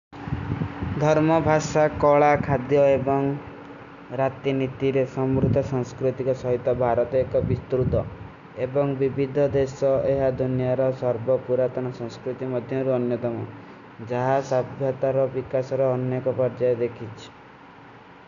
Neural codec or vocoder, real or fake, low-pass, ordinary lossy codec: none; real; 7.2 kHz; none